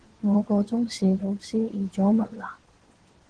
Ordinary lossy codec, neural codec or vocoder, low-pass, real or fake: Opus, 16 kbps; vocoder, 22.05 kHz, 80 mel bands, WaveNeXt; 9.9 kHz; fake